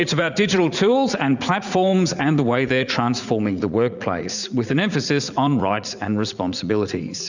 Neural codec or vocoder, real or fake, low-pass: vocoder, 44.1 kHz, 80 mel bands, Vocos; fake; 7.2 kHz